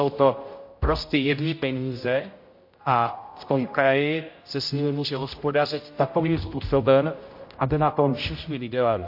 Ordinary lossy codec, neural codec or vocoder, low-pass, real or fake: MP3, 32 kbps; codec, 16 kHz, 0.5 kbps, X-Codec, HuBERT features, trained on general audio; 5.4 kHz; fake